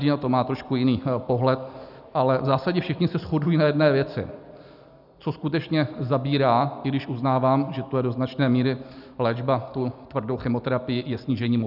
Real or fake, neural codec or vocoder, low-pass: real; none; 5.4 kHz